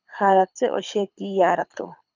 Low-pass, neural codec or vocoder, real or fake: 7.2 kHz; codec, 24 kHz, 6 kbps, HILCodec; fake